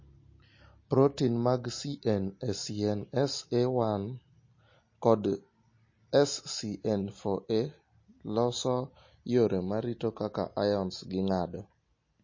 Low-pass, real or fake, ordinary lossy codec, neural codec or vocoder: 7.2 kHz; real; MP3, 32 kbps; none